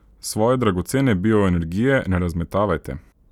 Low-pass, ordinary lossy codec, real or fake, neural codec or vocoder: 19.8 kHz; none; real; none